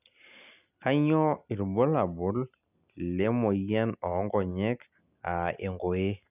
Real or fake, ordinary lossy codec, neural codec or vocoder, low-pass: real; none; none; 3.6 kHz